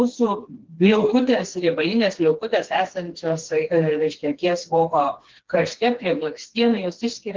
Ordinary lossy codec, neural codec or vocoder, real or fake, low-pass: Opus, 16 kbps; codec, 16 kHz, 2 kbps, FreqCodec, smaller model; fake; 7.2 kHz